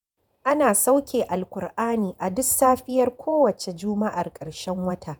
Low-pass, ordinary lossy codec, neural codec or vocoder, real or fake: none; none; vocoder, 48 kHz, 128 mel bands, Vocos; fake